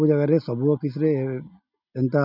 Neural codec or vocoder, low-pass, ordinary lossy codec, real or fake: none; 5.4 kHz; none; real